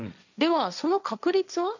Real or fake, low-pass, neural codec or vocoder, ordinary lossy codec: fake; 7.2 kHz; codec, 16 kHz, 1.1 kbps, Voila-Tokenizer; none